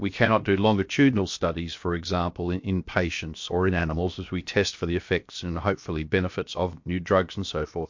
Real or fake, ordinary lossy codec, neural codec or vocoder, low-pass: fake; MP3, 48 kbps; codec, 16 kHz, 0.7 kbps, FocalCodec; 7.2 kHz